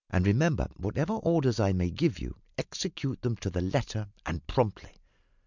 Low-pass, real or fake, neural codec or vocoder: 7.2 kHz; real; none